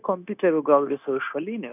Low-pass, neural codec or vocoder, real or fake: 3.6 kHz; codec, 16 kHz, 0.9 kbps, LongCat-Audio-Codec; fake